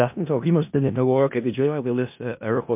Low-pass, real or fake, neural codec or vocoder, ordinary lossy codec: 3.6 kHz; fake; codec, 16 kHz in and 24 kHz out, 0.4 kbps, LongCat-Audio-Codec, four codebook decoder; MP3, 32 kbps